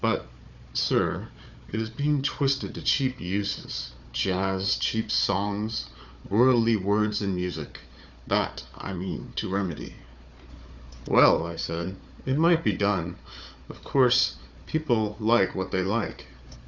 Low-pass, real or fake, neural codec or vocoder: 7.2 kHz; fake; codec, 16 kHz, 4 kbps, FunCodec, trained on Chinese and English, 50 frames a second